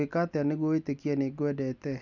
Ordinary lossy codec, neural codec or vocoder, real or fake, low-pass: none; none; real; 7.2 kHz